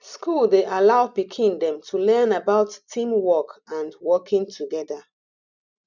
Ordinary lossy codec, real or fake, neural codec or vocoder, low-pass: none; real; none; 7.2 kHz